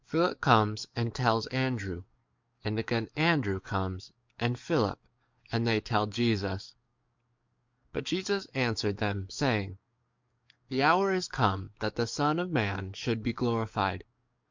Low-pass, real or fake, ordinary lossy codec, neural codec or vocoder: 7.2 kHz; fake; MP3, 64 kbps; codec, 44.1 kHz, 7.8 kbps, DAC